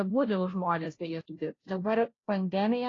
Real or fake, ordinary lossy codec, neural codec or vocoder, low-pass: fake; AAC, 32 kbps; codec, 16 kHz, 0.5 kbps, FunCodec, trained on Chinese and English, 25 frames a second; 7.2 kHz